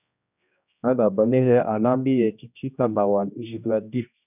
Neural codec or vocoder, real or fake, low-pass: codec, 16 kHz, 1 kbps, X-Codec, HuBERT features, trained on general audio; fake; 3.6 kHz